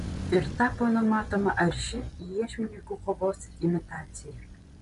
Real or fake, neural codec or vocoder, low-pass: fake; vocoder, 24 kHz, 100 mel bands, Vocos; 10.8 kHz